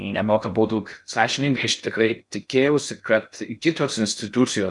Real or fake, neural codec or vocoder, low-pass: fake; codec, 16 kHz in and 24 kHz out, 0.6 kbps, FocalCodec, streaming, 4096 codes; 10.8 kHz